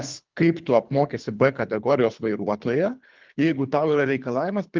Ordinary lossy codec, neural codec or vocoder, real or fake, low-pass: Opus, 32 kbps; codec, 24 kHz, 3 kbps, HILCodec; fake; 7.2 kHz